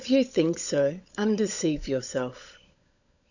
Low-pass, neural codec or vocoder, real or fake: 7.2 kHz; codec, 16 kHz, 16 kbps, FunCodec, trained on LibriTTS, 50 frames a second; fake